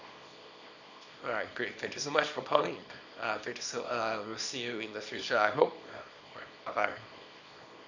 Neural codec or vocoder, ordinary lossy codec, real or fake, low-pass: codec, 24 kHz, 0.9 kbps, WavTokenizer, small release; none; fake; 7.2 kHz